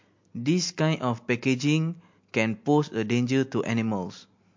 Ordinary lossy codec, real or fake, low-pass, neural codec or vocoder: MP3, 48 kbps; real; 7.2 kHz; none